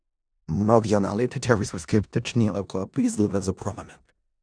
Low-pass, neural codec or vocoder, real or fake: 9.9 kHz; codec, 16 kHz in and 24 kHz out, 0.4 kbps, LongCat-Audio-Codec, four codebook decoder; fake